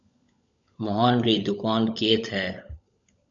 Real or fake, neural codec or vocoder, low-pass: fake; codec, 16 kHz, 16 kbps, FunCodec, trained on LibriTTS, 50 frames a second; 7.2 kHz